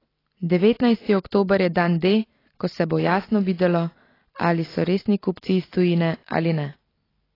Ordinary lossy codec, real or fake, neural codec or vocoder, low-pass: AAC, 24 kbps; real; none; 5.4 kHz